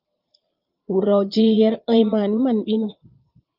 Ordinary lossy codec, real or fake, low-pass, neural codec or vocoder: Opus, 24 kbps; fake; 5.4 kHz; vocoder, 22.05 kHz, 80 mel bands, Vocos